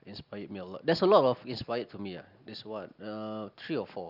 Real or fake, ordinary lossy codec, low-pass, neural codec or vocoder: real; Opus, 64 kbps; 5.4 kHz; none